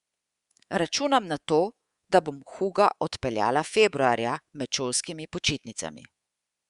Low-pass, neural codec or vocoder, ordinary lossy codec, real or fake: 10.8 kHz; codec, 24 kHz, 3.1 kbps, DualCodec; Opus, 64 kbps; fake